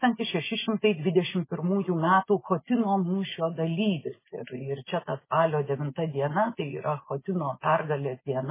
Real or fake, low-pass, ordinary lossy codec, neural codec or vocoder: real; 3.6 kHz; MP3, 16 kbps; none